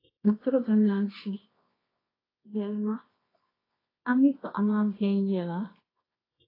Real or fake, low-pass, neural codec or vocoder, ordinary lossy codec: fake; 5.4 kHz; codec, 24 kHz, 0.9 kbps, WavTokenizer, medium music audio release; AAC, 24 kbps